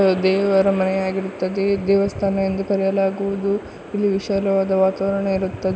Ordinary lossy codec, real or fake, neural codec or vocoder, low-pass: none; real; none; none